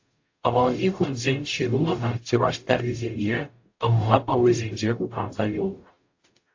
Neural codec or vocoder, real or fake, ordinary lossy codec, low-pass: codec, 44.1 kHz, 0.9 kbps, DAC; fake; AAC, 48 kbps; 7.2 kHz